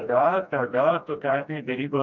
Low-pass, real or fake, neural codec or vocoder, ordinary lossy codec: 7.2 kHz; fake; codec, 16 kHz, 1 kbps, FreqCodec, smaller model; MP3, 64 kbps